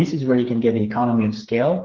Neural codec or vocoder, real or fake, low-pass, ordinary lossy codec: codec, 16 kHz, 4 kbps, FreqCodec, smaller model; fake; 7.2 kHz; Opus, 16 kbps